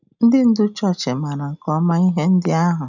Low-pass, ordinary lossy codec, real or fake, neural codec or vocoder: 7.2 kHz; none; real; none